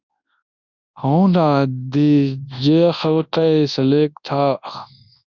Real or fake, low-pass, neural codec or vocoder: fake; 7.2 kHz; codec, 24 kHz, 0.9 kbps, WavTokenizer, large speech release